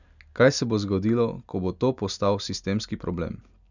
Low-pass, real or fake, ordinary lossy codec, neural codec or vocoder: 7.2 kHz; real; none; none